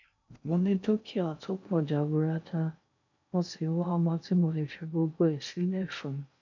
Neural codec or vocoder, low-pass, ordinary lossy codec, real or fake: codec, 16 kHz in and 24 kHz out, 0.6 kbps, FocalCodec, streaming, 2048 codes; 7.2 kHz; none; fake